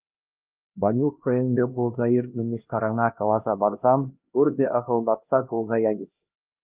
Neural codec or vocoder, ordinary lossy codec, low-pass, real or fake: codec, 16 kHz, 1 kbps, X-Codec, HuBERT features, trained on LibriSpeech; Opus, 24 kbps; 3.6 kHz; fake